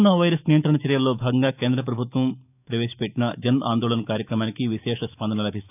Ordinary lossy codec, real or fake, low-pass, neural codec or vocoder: none; fake; 3.6 kHz; autoencoder, 48 kHz, 128 numbers a frame, DAC-VAE, trained on Japanese speech